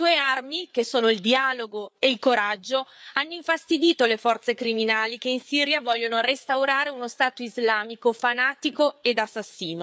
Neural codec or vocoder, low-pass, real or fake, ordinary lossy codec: codec, 16 kHz, 4 kbps, FreqCodec, larger model; none; fake; none